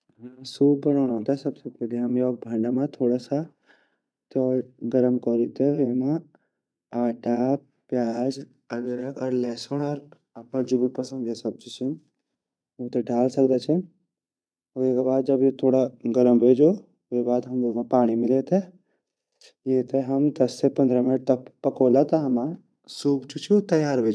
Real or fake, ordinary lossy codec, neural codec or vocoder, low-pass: fake; none; vocoder, 22.05 kHz, 80 mel bands, Vocos; none